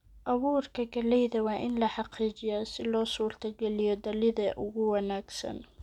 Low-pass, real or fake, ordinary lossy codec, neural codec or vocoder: 19.8 kHz; real; none; none